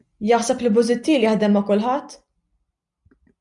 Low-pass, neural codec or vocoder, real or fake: 10.8 kHz; none; real